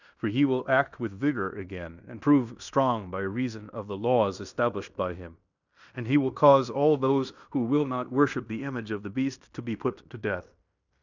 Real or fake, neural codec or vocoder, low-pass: fake; codec, 16 kHz in and 24 kHz out, 0.9 kbps, LongCat-Audio-Codec, fine tuned four codebook decoder; 7.2 kHz